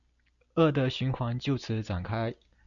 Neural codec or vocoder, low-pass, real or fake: none; 7.2 kHz; real